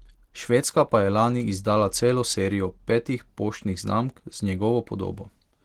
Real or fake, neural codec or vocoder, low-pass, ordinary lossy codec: real; none; 19.8 kHz; Opus, 16 kbps